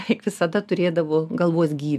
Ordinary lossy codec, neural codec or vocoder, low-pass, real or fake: AAC, 96 kbps; none; 14.4 kHz; real